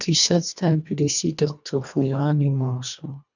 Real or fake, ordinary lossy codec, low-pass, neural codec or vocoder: fake; none; 7.2 kHz; codec, 24 kHz, 1.5 kbps, HILCodec